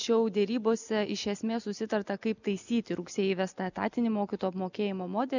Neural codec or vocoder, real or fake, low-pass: none; real; 7.2 kHz